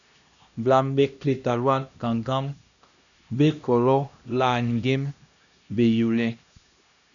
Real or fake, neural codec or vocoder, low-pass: fake; codec, 16 kHz, 1 kbps, X-Codec, HuBERT features, trained on LibriSpeech; 7.2 kHz